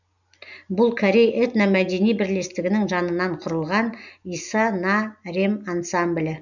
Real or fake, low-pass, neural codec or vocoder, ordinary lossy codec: real; 7.2 kHz; none; none